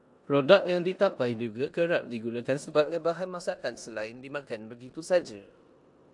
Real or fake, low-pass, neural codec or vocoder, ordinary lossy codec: fake; 10.8 kHz; codec, 16 kHz in and 24 kHz out, 0.9 kbps, LongCat-Audio-Codec, four codebook decoder; AAC, 64 kbps